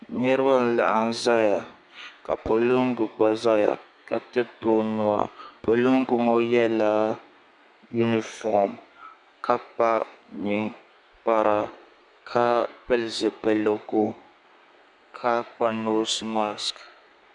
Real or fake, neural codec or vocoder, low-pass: fake; codec, 32 kHz, 1.9 kbps, SNAC; 10.8 kHz